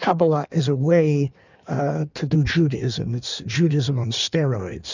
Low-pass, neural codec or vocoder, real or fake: 7.2 kHz; codec, 16 kHz in and 24 kHz out, 1.1 kbps, FireRedTTS-2 codec; fake